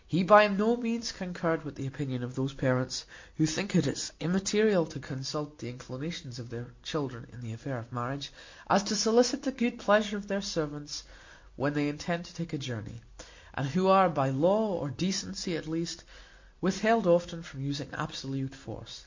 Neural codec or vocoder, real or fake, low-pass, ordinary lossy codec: none; real; 7.2 kHz; MP3, 48 kbps